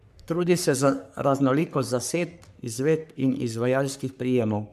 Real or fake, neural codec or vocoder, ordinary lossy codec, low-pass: fake; codec, 44.1 kHz, 3.4 kbps, Pupu-Codec; none; 14.4 kHz